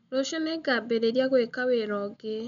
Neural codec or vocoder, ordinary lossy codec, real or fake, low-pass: none; none; real; 7.2 kHz